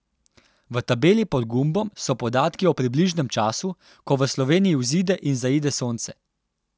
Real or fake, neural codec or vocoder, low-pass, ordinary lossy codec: real; none; none; none